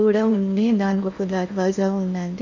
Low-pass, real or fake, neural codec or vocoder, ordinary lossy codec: 7.2 kHz; fake; codec, 16 kHz in and 24 kHz out, 0.8 kbps, FocalCodec, streaming, 65536 codes; none